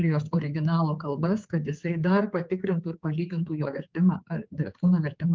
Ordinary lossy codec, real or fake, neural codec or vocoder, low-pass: Opus, 24 kbps; fake; codec, 16 kHz, 4 kbps, X-Codec, HuBERT features, trained on general audio; 7.2 kHz